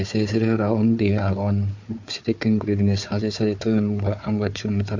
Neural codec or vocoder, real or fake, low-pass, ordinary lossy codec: codec, 16 kHz, 4 kbps, FunCodec, trained on Chinese and English, 50 frames a second; fake; 7.2 kHz; MP3, 48 kbps